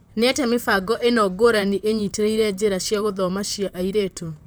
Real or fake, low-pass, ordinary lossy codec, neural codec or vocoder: fake; none; none; vocoder, 44.1 kHz, 128 mel bands, Pupu-Vocoder